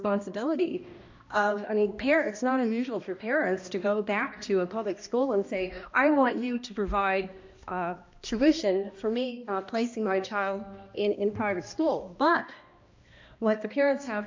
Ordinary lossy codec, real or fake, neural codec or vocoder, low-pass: MP3, 64 kbps; fake; codec, 16 kHz, 1 kbps, X-Codec, HuBERT features, trained on balanced general audio; 7.2 kHz